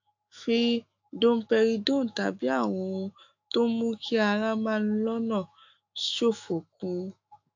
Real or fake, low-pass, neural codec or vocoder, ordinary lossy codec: fake; 7.2 kHz; autoencoder, 48 kHz, 128 numbers a frame, DAC-VAE, trained on Japanese speech; AAC, 48 kbps